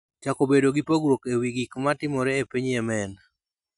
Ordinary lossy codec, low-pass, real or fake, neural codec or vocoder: MP3, 96 kbps; 10.8 kHz; real; none